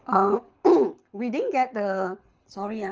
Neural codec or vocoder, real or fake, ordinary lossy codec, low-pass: codec, 24 kHz, 6 kbps, HILCodec; fake; Opus, 24 kbps; 7.2 kHz